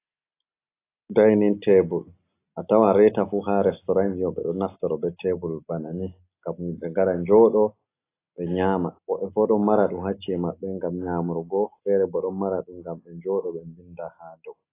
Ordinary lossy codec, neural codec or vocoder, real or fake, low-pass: AAC, 24 kbps; none; real; 3.6 kHz